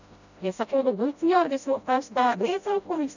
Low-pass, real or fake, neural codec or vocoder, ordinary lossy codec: 7.2 kHz; fake; codec, 16 kHz, 0.5 kbps, FreqCodec, smaller model; none